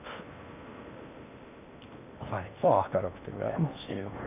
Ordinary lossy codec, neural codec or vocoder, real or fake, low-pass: none; codec, 16 kHz in and 24 kHz out, 0.8 kbps, FocalCodec, streaming, 65536 codes; fake; 3.6 kHz